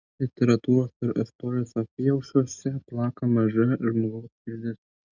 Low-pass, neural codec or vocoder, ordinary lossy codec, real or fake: 7.2 kHz; none; Opus, 64 kbps; real